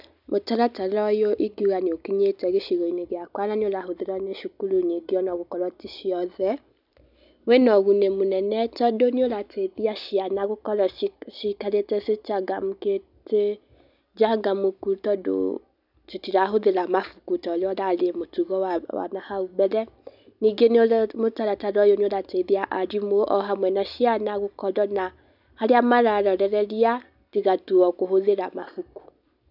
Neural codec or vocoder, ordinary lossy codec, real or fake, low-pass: none; none; real; 5.4 kHz